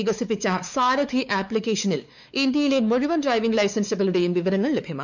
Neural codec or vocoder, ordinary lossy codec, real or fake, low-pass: codec, 16 kHz, 4 kbps, X-Codec, WavLM features, trained on Multilingual LibriSpeech; none; fake; 7.2 kHz